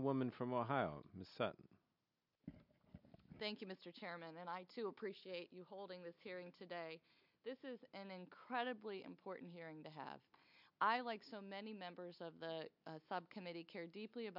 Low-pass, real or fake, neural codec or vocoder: 5.4 kHz; real; none